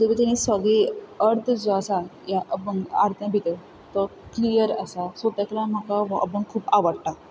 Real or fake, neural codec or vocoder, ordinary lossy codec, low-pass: real; none; none; none